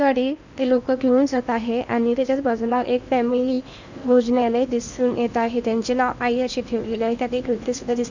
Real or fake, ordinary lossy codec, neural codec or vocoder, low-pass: fake; none; codec, 16 kHz in and 24 kHz out, 0.8 kbps, FocalCodec, streaming, 65536 codes; 7.2 kHz